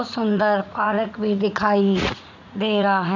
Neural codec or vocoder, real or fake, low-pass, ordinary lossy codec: vocoder, 44.1 kHz, 80 mel bands, Vocos; fake; 7.2 kHz; none